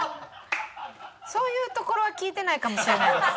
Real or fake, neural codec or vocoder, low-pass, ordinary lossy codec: real; none; none; none